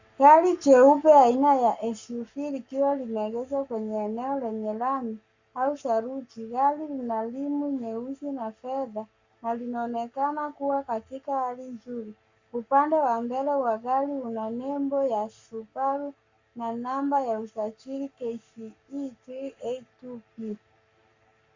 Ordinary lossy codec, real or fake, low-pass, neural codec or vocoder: Opus, 64 kbps; fake; 7.2 kHz; codec, 44.1 kHz, 7.8 kbps, Pupu-Codec